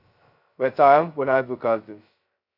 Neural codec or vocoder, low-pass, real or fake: codec, 16 kHz, 0.2 kbps, FocalCodec; 5.4 kHz; fake